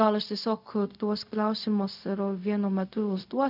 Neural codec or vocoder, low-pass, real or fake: codec, 16 kHz, 0.4 kbps, LongCat-Audio-Codec; 5.4 kHz; fake